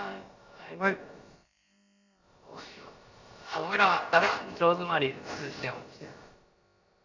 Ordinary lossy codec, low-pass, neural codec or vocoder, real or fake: Opus, 64 kbps; 7.2 kHz; codec, 16 kHz, about 1 kbps, DyCAST, with the encoder's durations; fake